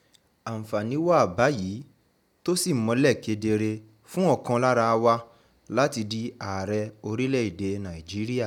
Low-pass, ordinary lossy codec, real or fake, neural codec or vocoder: 19.8 kHz; none; real; none